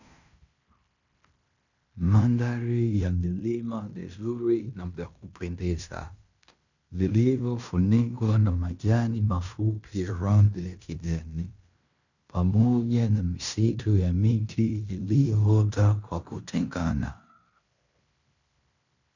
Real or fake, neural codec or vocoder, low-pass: fake; codec, 16 kHz in and 24 kHz out, 0.9 kbps, LongCat-Audio-Codec, fine tuned four codebook decoder; 7.2 kHz